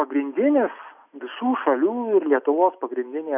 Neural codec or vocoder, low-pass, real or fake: none; 3.6 kHz; real